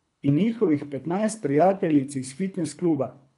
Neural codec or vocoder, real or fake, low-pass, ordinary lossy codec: codec, 24 kHz, 3 kbps, HILCodec; fake; 10.8 kHz; none